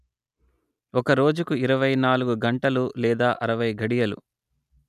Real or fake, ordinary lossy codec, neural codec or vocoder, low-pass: real; none; none; 14.4 kHz